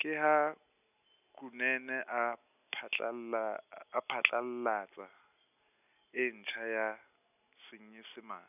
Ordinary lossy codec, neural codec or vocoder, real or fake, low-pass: none; none; real; 3.6 kHz